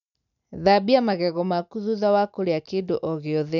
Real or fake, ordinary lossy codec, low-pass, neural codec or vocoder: real; none; 7.2 kHz; none